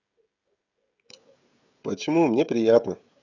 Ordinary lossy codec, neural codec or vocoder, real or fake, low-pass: Opus, 64 kbps; codec, 16 kHz, 16 kbps, FreqCodec, smaller model; fake; 7.2 kHz